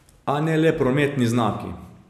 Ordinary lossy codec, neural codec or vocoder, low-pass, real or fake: none; none; 14.4 kHz; real